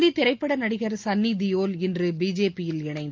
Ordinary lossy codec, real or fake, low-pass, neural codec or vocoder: Opus, 24 kbps; real; 7.2 kHz; none